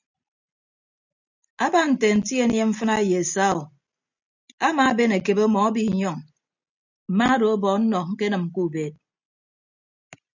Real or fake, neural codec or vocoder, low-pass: real; none; 7.2 kHz